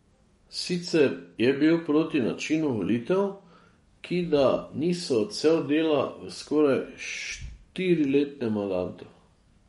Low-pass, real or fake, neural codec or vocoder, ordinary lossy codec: 19.8 kHz; fake; codec, 44.1 kHz, 7.8 kbps, DAC; MP3, 48 kbps